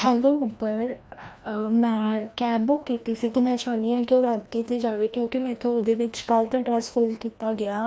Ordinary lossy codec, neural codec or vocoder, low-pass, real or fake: none; codec, 16 kHz, 1 kbps, FreqCodec, larger model; none; fake